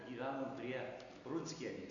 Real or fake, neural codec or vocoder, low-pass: real; none; 7.2 kHz